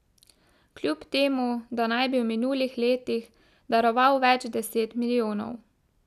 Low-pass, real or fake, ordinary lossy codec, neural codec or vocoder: 14.4 kHz; real; none; none